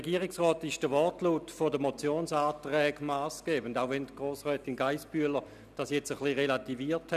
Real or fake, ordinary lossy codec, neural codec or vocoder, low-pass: real; none; none; 14.4 kHz